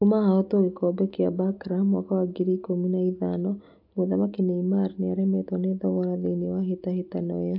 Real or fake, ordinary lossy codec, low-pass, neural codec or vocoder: real; AAC, 48 kbps; 5.4 kHz; none